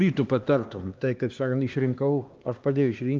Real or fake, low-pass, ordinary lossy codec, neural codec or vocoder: fake; 7.2 kHz; Opus, 24 kbps; codec, 16 kHz, 2 kbps, X-Codec, HuBERT features, trained on LibriSpeech